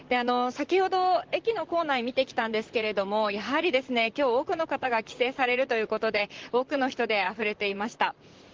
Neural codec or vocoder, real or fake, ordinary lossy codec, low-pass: vocoder, 44.1 kHz, 128 mel bands, Pupu-Vocoder; fake; Opus, 16 kbps; 7.2 kHz